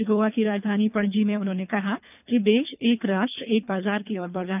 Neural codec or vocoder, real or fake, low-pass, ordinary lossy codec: codec, 24 kHz, 3 kbps, HILCodec; fake; 3.6 kHz; none